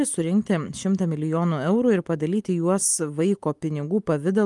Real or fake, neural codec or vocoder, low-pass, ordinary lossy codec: real; none; 10.8 kHz; Opus, 32 kbps